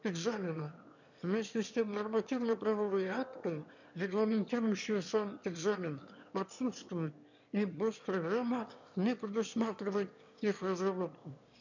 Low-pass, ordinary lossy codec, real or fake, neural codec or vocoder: 7.2 kHz; none; fake; autoencoder, 22.05 kHz, a latent of 192 numbers a frame, VITS, trained on one speaker